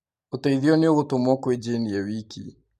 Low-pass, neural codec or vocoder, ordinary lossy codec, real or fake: 14.4 kHz; vocoder, 44.1 kHz, 128 mel bands every 512 samples, BigVGAN v2; MP3, 64 kbps; fake